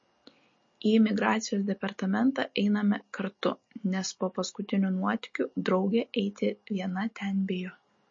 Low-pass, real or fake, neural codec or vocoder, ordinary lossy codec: 7.2 kHz; real; none; MP3, 32 kbps